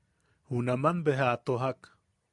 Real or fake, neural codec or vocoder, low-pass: real; none; 10.8 kHz